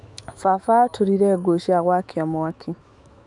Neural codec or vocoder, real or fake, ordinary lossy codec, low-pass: autoencoder, 48 kHz, 128 numbers a frame, DAC-VAE, trained on Japanese speech; fake; none; 10.8 kHz